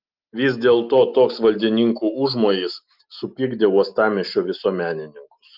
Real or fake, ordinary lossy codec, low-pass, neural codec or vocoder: real; Opus, 32 kbps; 5.4 kHz; none